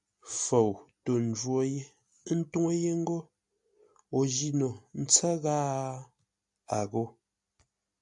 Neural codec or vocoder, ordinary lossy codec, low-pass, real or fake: none; MP3, 96 kbps; 10.8 kHz; real